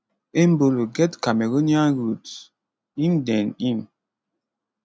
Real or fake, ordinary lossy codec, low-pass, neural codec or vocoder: real; none; none; none